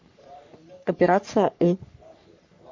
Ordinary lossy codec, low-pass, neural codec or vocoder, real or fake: MP3, 48 kbps; 7.2 kHz; codec, 44.1 kHz, 3.4 kbps, Pupu-Codec; fake